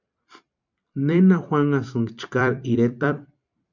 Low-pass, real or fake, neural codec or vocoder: 7.2 kHz; fake; vocoder, 44.1 kHz, 128 mel bands every 256 samples, BigVGAN v2